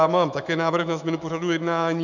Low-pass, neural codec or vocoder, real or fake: 7.2 kHz; autoencoder, 48 kHz, 128 numbers a frame, DAC-VAE, trained on Japanese speech; fake